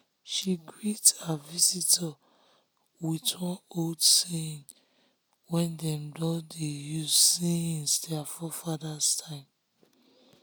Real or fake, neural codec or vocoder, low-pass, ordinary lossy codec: real; none; none; none